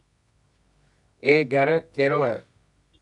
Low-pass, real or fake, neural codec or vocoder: 10.8 kHz; fake; codec, 24 kHz, 0.9 kbps, WavTokenizer, medium music audio release